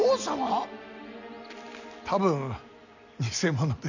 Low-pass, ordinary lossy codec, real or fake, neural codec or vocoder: 7.2 kHz; none; real; none